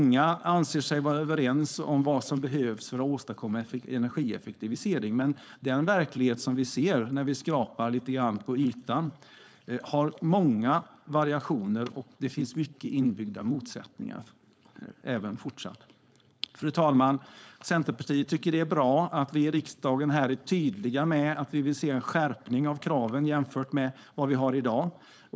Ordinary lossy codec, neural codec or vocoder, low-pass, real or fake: none; codec, 16 kHz, 4.8 kbps, FACodec; none; fake